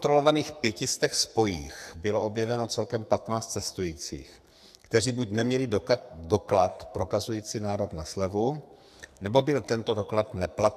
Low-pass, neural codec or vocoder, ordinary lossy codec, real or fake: 14.4 kHz; codec, 44.1 kHz, 2.6 kbps, SNAC; AAC, 96 kbps; fake